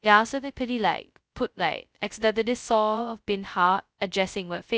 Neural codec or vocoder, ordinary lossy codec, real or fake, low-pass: codec, 16 kHz, 0.2 kbps, FocalCodec; none; fake; none